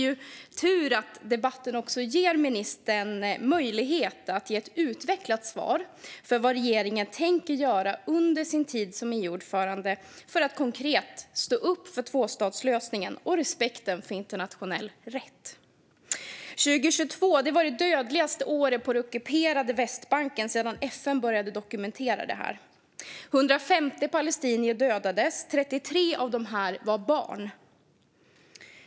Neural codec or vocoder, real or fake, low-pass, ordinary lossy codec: none; real; none; none